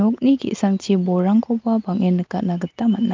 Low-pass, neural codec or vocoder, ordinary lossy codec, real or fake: 7.2 kHz; none; Opus, 32 kbps; real